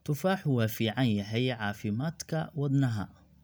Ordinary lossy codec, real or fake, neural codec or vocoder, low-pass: none; real; none; none